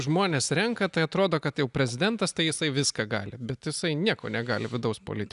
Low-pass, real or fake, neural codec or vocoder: 10.8 kHz; real; none